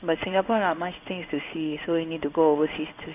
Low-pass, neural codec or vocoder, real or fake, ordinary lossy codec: 3.6 kHz; codec, 16 kHz in and 24 kHz out, 1 kbps, XY-Tokenizer; fake; none